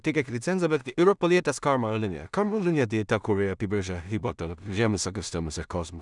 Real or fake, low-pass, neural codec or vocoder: fake; 10.8 kHz; codec, 16 kHz in and 24 kHz out, 0.4 kbps, LongCat-Audio-Codec, two codebook decoder